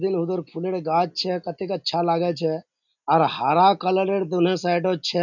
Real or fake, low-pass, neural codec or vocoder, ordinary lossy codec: real; 7.2 kHz; none; none